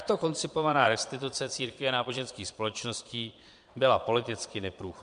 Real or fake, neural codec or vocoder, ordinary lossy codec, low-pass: fake; vocoder, 22.05 kHz, 80 mel bands, Vocos; MP3, 64 kbps; 9.9 kHz